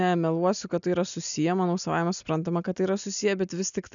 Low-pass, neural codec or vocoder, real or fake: 7.2 kHz; none; real